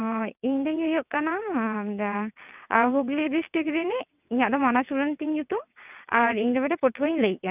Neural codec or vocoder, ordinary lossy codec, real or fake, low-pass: vocoder, 22.05 kHz, 80 mel bands, WaveNeXt; none; fake; 3.6 kHz